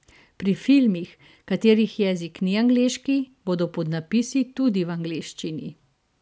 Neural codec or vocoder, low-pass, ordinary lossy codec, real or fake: none; none; none; real